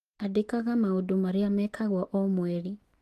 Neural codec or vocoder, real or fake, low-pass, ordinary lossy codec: autoencoder, 48 kHz, 128 numbers a frame, DAC-VAE, trained on Japanese speech; fake; 14.4 kHz; Opus, 24 kbps